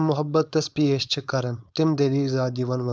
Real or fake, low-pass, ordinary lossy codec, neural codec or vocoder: fake; none; none; codec, 16 kHz, 4.8 kbps, FACodec